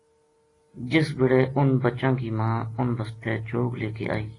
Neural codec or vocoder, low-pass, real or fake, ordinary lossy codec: none; 10.8 kHz; real; AAC, 32 kbps